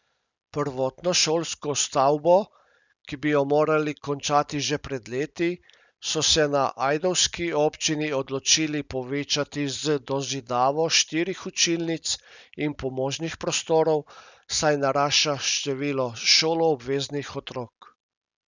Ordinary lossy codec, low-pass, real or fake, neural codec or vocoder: none; 7.2 kHz; real; none